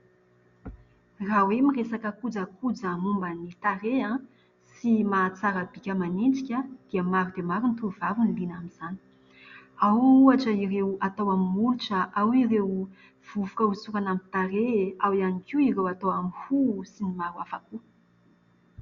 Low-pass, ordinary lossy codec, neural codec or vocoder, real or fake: 7.2 kHz; Opus, 64 kbps; none; real